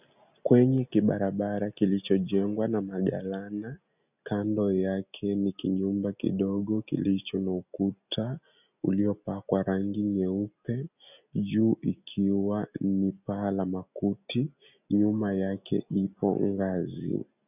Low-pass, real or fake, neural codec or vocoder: 3.6 kHz; real; none